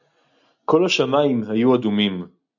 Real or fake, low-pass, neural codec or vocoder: real; 7.2 kHz; none